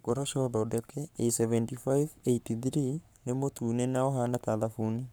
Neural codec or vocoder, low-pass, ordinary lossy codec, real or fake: codec, 44.1 kHz, 7.8 kbps, Pupu-Codec; none; none; fake